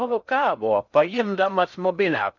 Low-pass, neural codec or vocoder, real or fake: 7.2 kHz; codec, 16 kHz in and 24 kHz out, 0.6 kbps, FocalCodec, streaming, 2048 codes; fake